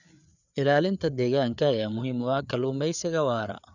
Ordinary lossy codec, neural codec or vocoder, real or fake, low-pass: none; codec, 16 kHz, 4 kbps, FreqCodec, larger model; fake; 7.2 kHz